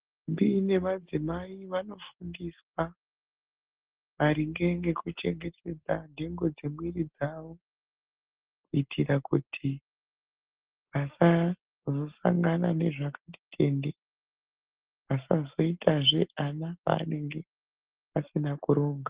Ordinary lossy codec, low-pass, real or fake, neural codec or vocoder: Opus, 16 kbps; 3.6 kHz; real; none